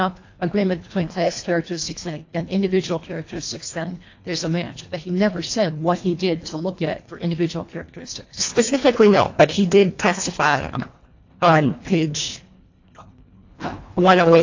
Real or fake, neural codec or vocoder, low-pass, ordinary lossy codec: fake; codec, 24 kHz, 1.5 kbps, HILCodec; 7.2 kHz; AAC, 48 kbps